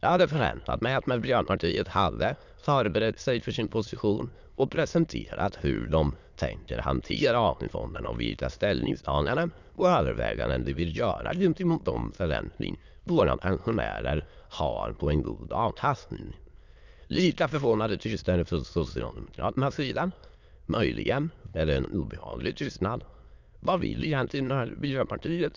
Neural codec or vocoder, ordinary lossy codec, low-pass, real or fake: autoencoder, 22.05 kHz, a latent of 192 numbers a frame, VITS, trained on many speakers; none; 7.2 kHz; fake